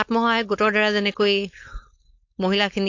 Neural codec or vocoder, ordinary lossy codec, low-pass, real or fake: codec, 16 kHz, 4.8 kbps, FACodec; AAC, 48 kbps; 7.2 kHz; fake